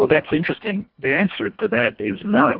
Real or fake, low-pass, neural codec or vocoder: fake; 5.4 kHz; codec, 24 kHz, 1.5 kbps, HILCodec